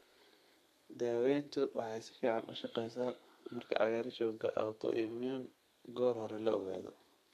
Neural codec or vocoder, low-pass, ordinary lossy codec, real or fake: codec, 32 kHz, 1.9 kbps, SNAC; 14.4 kHz; MP3, 64 kbps; fake